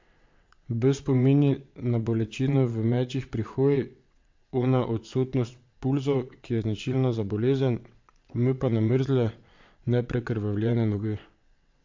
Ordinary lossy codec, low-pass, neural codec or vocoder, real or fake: MP3, 48 kbps; 7.2 kHz; vocoder, 22.05 kHz, 80 mel bands, WaveNeXt; fake